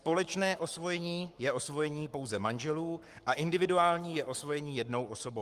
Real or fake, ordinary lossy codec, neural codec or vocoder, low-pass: fake; Opus, 32 kbps; codec, 44.1 kHz, 7.8 kbps, Pupu-Codec; 14.4 kHz